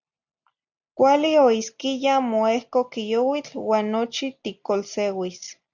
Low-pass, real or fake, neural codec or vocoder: 7.2 kHz; real; none